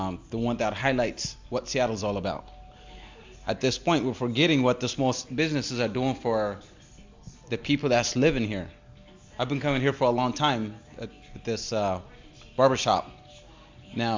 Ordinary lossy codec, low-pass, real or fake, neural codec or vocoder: MP3, 64 kbps; 7.2 kHz; real; none